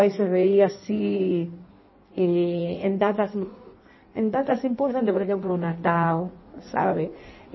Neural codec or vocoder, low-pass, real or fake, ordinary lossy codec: codec, 16 kHz in and 24 kHz out, 1.1 kbps, FireRedTTS-2 codec; 7.2 kHz; fake; MP3, 24 kbps